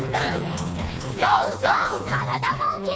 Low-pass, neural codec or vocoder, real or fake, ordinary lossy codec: none; codec, 16 kHz, 2 kbps, FreqCodec, smaller model; fake; none